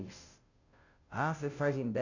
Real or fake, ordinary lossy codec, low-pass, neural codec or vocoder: fake; none; 7.2 kHz; codec, 16 kHz, 0.5 kbps, FunCodec, trained on Chinese and English, 25 frames a second